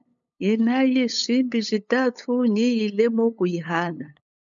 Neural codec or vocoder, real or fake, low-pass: codec, 16 kHz, 8 kbps, FunCodec, trained on LibriTTS, 25 frames a second; fake; 7.2 kHz